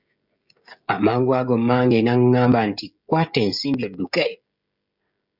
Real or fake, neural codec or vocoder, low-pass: fake; codec, 16 kHz, 16 kbps, FreqCodec, smaller model; 5.4 kHz